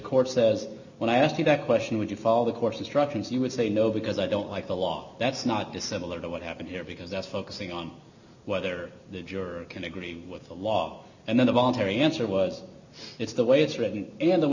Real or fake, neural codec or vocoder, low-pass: real; none; 7.2 kHz